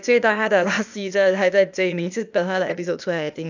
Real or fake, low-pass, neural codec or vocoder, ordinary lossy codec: fake; 7.2 kHz; codec, 16 kHz, 0.8 kbps, ZipCodec; none